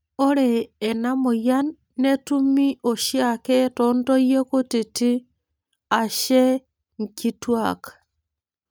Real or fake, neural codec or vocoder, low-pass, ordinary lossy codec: real; none; none; none